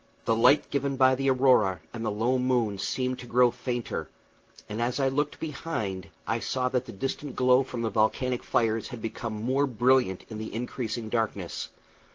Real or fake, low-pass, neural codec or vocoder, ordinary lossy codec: real; 7.2 kHz; none; Opus, 16 kbps